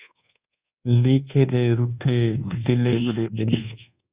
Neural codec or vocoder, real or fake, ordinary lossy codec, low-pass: codec, 24 kHz, 1.2 kbps, DualCodec; fake; Opus, 64 kbps; 3.6 kHz